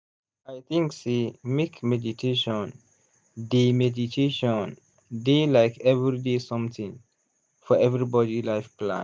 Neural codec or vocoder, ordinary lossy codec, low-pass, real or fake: none; none; none; real